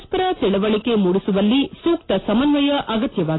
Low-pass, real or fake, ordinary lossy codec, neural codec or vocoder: 7.2 kHz; real; AAC, 16 kbps; none